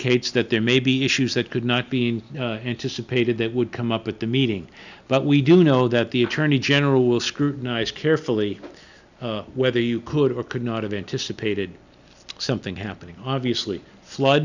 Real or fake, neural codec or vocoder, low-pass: real; none; 7.2 kHz